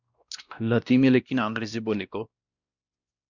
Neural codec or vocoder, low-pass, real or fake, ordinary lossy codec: codec, 16 kHz, 1 kbps, X-Codec, WavLM features, trained on Multilingual LibriSpeech; 7.2 kHz; fake; Opus, 64 kbps